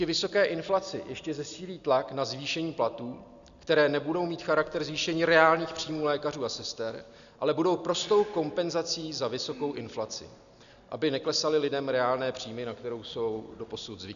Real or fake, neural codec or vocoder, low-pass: real; none; 7.2 kHz